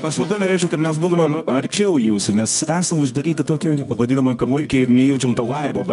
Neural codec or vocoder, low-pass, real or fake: codec, 24 kHz, 0.9 kbps, WavTokenizer, medium music audio release; 10.8 kHz; fake